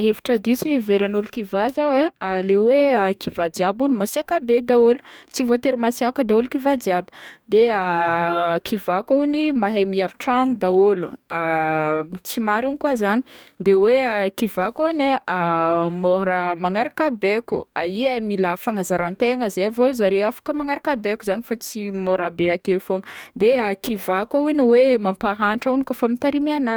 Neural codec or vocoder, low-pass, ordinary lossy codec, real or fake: codec, 44.1 kHz, 2.6 kbps, DAC; none; none; fake